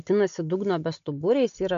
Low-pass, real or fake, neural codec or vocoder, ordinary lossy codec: 7.2 kHz; real; none; MP3, 96 kbps